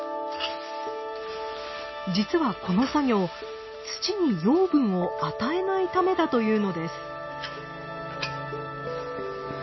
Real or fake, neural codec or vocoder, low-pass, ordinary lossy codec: real; none; 7.2 kHz; MP3, 24 kbps